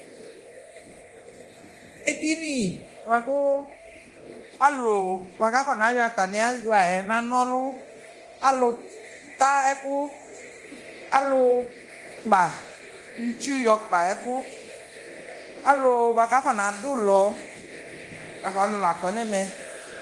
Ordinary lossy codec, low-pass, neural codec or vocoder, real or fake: Opus, 24 kbps; 10.8 kHz; codec, 24 kHz, 0.9 kbps, DualCodec; fake